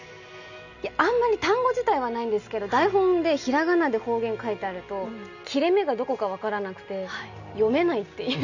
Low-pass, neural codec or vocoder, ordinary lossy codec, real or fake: 7.2 kHz; none; none; real